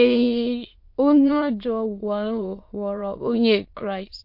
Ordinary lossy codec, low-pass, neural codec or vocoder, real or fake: MP3, 32 kbps; 5.4 kHz; autoencoder, 22.05 kHz, a latent of 192 numbers a frame, VITS, trained on many speakers; fake